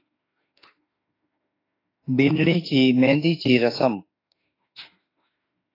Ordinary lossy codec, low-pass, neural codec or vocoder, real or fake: AAC, 24 kbps; 5.4 kHz; autoencoder, 48 kHz, 32 numbers a frame, DAC-VAE, trained on Japanese speech; fake